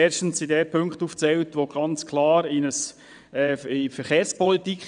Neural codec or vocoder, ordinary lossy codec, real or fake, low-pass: vocoder, 22.05 kHz, 80 mel bands, WaveNeXt; none; fake; 9.9 kHz